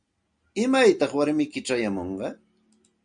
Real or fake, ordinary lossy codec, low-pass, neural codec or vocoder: real; MP3, 64 kbps; 9.9 kHz; none